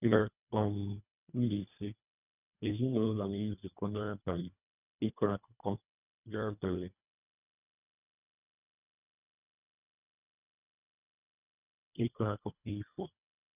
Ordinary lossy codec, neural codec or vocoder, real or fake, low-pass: none; codec, 24 kHz, 1.5 kbps, HILCodec; fake; 3.6 kHz